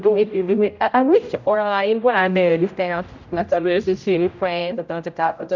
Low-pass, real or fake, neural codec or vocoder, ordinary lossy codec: 7.2 kHz; fake; codec, 16 kHz, 0.5 kbps, X-Codec, HuBERT features, trained on general audio; none